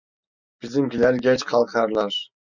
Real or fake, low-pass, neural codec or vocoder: real; 7.2 kHz; none